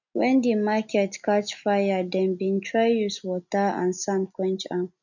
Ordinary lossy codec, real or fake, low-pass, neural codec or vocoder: none; real; 7.2 kHz; none